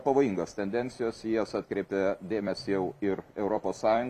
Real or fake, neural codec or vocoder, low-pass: fake; vocoder, 44.1 kHz, 128 mel bands every 256 samples, BigVGAN v2; 14.4 kHz